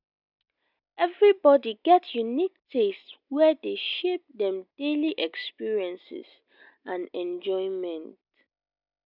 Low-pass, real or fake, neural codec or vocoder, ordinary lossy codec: 5.4 kHz; real; none; none